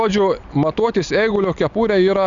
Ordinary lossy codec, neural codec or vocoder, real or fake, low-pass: Opus, 64 kbps; none; real; 7.2 kHz